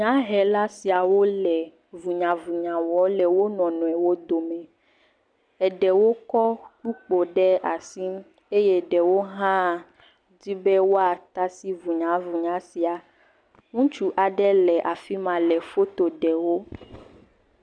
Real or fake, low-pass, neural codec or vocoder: real; 9.9 kHz; none